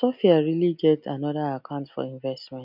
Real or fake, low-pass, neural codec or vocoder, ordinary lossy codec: real; 5.4 kHz; none; none